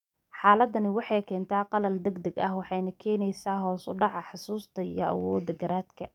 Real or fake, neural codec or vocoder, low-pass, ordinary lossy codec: fake; codec, 44.1 kHz, 7.8 kbps, DAC; 19.8 kHz; none